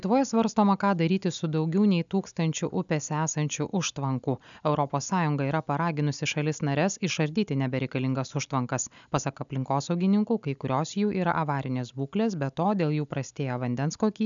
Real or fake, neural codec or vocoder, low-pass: real; none; 7.2 kHz